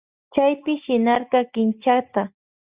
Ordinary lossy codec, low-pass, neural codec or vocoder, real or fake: Opus, 64 kbps; 3.6 kHz; none; real